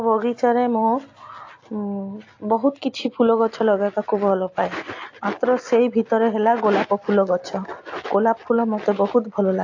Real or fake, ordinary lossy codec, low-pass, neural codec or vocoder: real; AAC, 48 kbps; 7.2 kHz; none